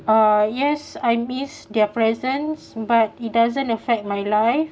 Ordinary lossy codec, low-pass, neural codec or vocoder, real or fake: none; none; none; real